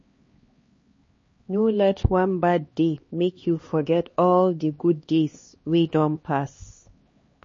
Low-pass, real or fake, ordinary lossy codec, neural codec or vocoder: 7.2 kHz; fake; MP3, 32 kbps; codec, 16 kHz, 2 kbps, X-Codec, HuBERT features, trained on LibriSpeech